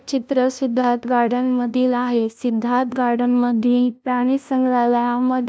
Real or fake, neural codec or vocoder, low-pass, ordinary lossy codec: fake; codec, 16 kHz, 0.5 kbps, FunCodec, trained on LibriTTS, 25 frames a second; none; none